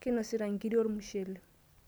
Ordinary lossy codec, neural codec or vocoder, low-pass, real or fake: none; none; none; real